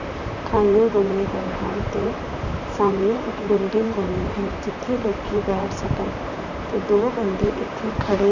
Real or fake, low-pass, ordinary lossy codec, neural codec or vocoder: fake; 7.2 kHz; none; vocoder, 44.1 kHz, 128 mel bands, Pupu-Vocoder